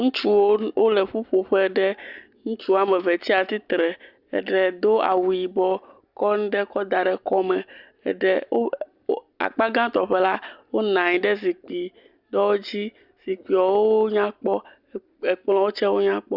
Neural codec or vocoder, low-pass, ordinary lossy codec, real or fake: none; 5.4 kHz; Opus, 64 kbps; real